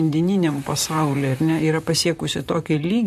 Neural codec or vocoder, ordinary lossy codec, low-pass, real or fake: vocoder, 44.1 kHz, 128 mel bands, Pupu-Vocoder; MP3, 64 kbps; 14.4 kHz; fake